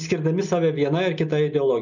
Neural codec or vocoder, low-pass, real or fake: none; 7.2 kHz; real